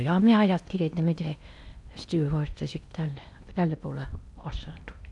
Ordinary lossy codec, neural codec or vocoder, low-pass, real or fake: none; codec, 16 kHz in and 24 kHz out, 0.8 kbps, FocalCodec, streaming, 65536 codes; 10.8 kHz; fake